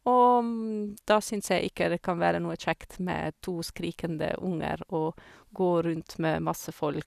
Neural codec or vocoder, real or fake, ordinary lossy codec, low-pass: none; real; none; 14.4 kHz